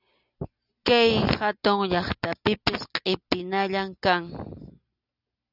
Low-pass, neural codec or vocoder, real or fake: 5.4 kHz; none; real